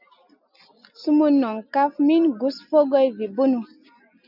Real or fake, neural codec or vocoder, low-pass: real; none; 5.4 kHz